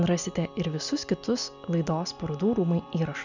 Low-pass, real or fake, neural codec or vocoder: 7.2 kHz; real; none